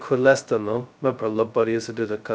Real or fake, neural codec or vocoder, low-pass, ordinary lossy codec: fake; codec, 16 kHz, 0.2 kbps, FocalCodec; none; none